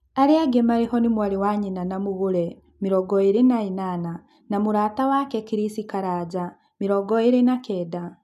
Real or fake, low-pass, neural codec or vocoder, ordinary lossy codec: real; 14.4 kHz; none; none